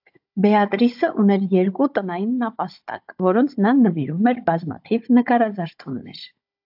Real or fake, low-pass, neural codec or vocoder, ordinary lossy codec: fake; 5.4 kHz; codec, 16 kHz, 16 kbps, FunCodec, trained on Chinese and English, 50 frames a second; AAC, 48 kbps